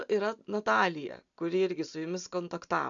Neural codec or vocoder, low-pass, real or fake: none; 7.2 kHz; real